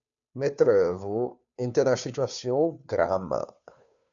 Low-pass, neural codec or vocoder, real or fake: 7.2 kHz; codec, 16 kHz, 2 kbps, FunCodec, trained on Chinese and English, 25 frames a second; fake